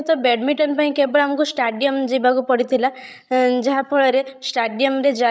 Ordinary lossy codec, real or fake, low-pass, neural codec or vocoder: none; fake; none; codec, 16 kHz, 16 kbps, FreqCodec, larger model